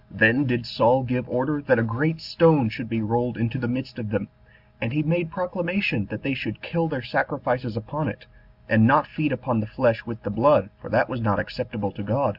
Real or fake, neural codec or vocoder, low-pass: real; none; 5.4 kHz